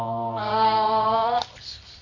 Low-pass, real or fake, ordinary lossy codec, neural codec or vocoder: 7.2 kHz; fake; none; codec, 24 kHz, 0.9 kbps, WavTokenizer, medium music audio release